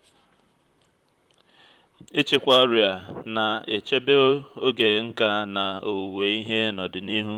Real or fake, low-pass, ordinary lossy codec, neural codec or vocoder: fake; 19.8 kHz; Opus, 32 kbps; vocoder, 44.1 kHz, 128 mel bands, Pupu-Vocoder